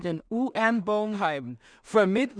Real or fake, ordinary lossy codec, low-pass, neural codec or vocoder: fake; none; 9.9 kHz; codec, 16 kHz in and 24 kHz out, 0.4 kbps, LongCat-Audio-Codec, two codebook decoder